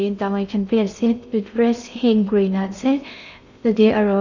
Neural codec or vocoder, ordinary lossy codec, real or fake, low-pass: codec, 16 kHz in and 24 kHz out, 0.6 kbps, FocalCodec, streaming, 2048 codes; none; fake; 7.2 kHz